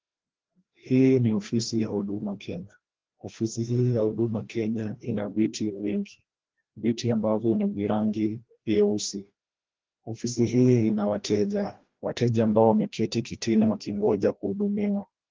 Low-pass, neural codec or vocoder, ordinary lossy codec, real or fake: 7.2 kHz; codec, 16 kHz, 1 kbps, FreqCodec, larger model; Opus, 16 kbps; fake